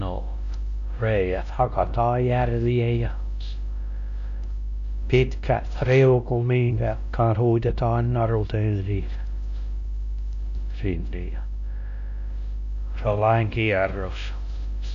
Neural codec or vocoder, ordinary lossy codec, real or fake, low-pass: codec, 16 kHz, 1 kbps, X-Codec, WavLM features, trained on Multilingual LibriSpeech; none; fake; 7.2 kHz